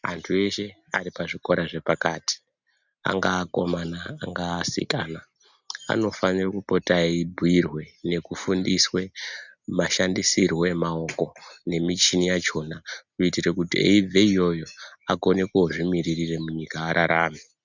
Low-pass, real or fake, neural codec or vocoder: 7.2 kHz; real; none